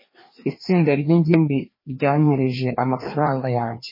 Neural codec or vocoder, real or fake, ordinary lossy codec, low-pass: codec, 16 kHz, 2 kbps, FreqCodec, larger model; fake; MP3, 24 kbps; 5.4 kHz